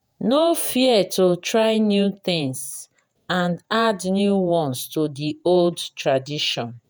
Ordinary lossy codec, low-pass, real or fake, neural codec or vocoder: none; none; fake; vocoder, 48 kHz, 128 mel bands, Vocos